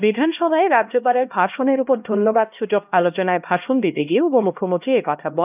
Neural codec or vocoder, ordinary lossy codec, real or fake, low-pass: codec, 16 kHz, 1 kbps, X-Codec, HuBERT features, trained on LibriSpeech; none; fake; 3.6 kHz